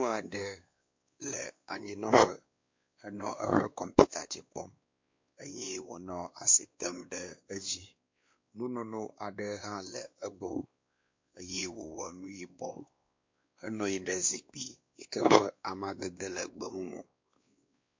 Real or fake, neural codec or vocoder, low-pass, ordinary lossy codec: fake; codec, 16 kHz, 2 kbps, X-Codec, WavLM features, trained on Multilingual LibriSpeech; 7.2 kHz; MP3, 48 kbps